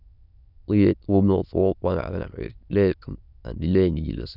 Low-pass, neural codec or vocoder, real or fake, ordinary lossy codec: 5.4 kHz; autoencoder, 22.05 kHz, a latent of 192 numbers a frame, VITS, trained on many speakers; fake; none